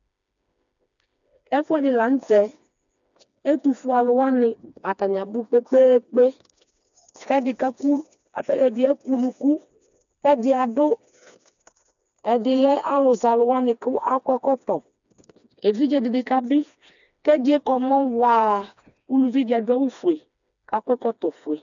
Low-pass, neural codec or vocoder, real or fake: 7.2 kHz; codec, 16 kHz, 2 kbps, FreqCodec, smaller model; fake